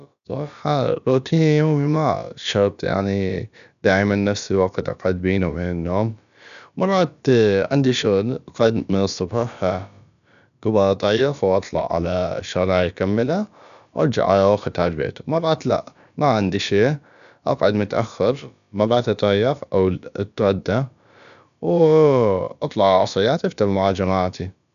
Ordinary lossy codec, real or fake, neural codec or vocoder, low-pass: none; fake; codec, 16 kHz, about 1 kbps, DyCAST, with the encoder's durations; 7.2 kHz